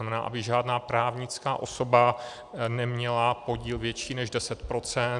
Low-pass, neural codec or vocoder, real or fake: 10.8 kHz; none; real